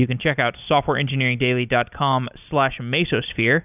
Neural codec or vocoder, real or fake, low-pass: none; real; 3.6 kHz